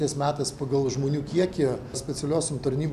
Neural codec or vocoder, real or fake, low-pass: none; real; 14.4 kHz